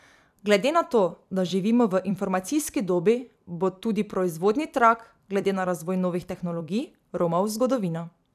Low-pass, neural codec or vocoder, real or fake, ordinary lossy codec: 14.4 kHz; vocoder, 44.1 kHz, 128 mel bands every 256 samples, BigVGAN v2; fake; none